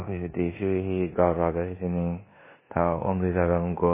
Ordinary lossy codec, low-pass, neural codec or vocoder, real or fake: MP3, 16 kbps; 3.6 kHz; codec, 16 kHz in and 24 kHz out, 0.9 kbps, LongCat-Audio-Codec, four codebook decoder; fake